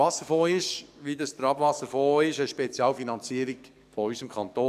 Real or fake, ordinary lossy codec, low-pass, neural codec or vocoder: fake; none; 14.4 kHz; codec, 44.1 kHz, 7.8 kbps, DAC